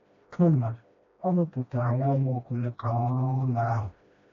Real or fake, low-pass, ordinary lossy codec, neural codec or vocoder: fake; 7.2 kHz; AAC, 48 kbps; codec, 16 kHz, 1 kbps, FreqCodec, smaller model